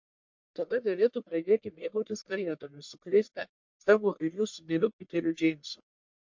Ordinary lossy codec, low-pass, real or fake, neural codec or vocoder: MP3, 48 kbps; 7.2 kHz; fake; codec, 44.1 kHz, 1.7 kbps, Pupu-Codec